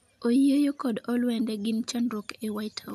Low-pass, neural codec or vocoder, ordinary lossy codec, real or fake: 14.4 kHz; none; none; real